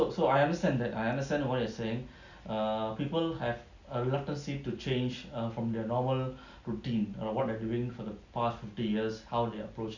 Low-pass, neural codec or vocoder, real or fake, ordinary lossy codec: 7.2 kHz; none; real; none